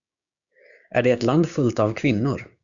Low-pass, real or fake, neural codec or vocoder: 7.2 kHz; fake; codec, 16 kHz, 6 kbps, DAC